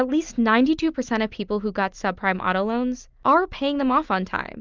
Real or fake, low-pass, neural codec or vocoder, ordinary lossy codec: real; 7.2 kHz; none; Opus, 24 kbps